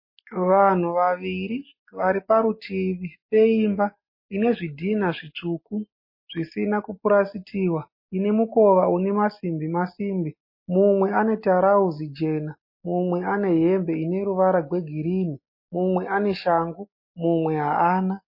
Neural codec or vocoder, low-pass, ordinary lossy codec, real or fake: none; 5.4 kHz; MP3, 24 kbps; real